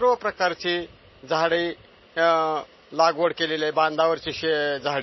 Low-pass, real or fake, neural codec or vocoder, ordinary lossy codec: 7.2 kHz; real; none; MP3, 24 kbps